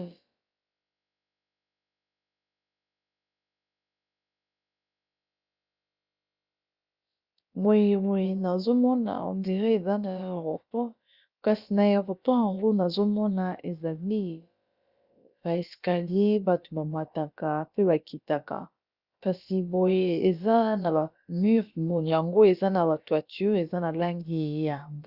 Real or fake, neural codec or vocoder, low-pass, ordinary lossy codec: fake; codec, 16 kHz, about 1 kbps, DyCAST, with the encoder's durations; 5.4 kHz; Opus, 64 kbps